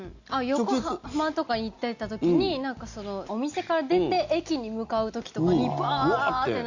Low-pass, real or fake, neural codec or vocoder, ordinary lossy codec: 7.2 kHz; real; none; Opus, 64 kbps